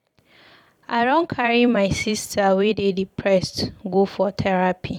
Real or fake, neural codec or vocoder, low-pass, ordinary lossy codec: fake; vocoder, 44.1 kHz, 128 mel bands every 256 samples, BigVGAN v2; 19.8 kHz; none